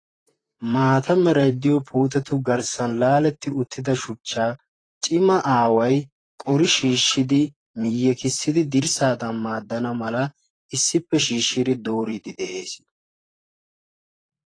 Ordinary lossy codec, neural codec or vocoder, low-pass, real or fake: AAC, 32 kbps; vocoder, 44.1 kHz, 128 mel bands, Pupu-Vocoder; 9.9 kHz; fake